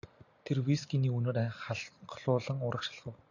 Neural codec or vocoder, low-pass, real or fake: none; 7.2 kHz; real